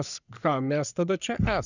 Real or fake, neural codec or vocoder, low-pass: fake; codec, 44.1 kHz, 3.4 kbps, Pupu-Codec; 7.2 kHz